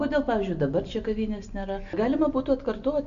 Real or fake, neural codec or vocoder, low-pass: real; none; 7.2 kHz